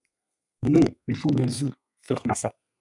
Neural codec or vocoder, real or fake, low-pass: codec, 32 kHz, 1.9 kbps, SNAC; fake; 10.8 kHz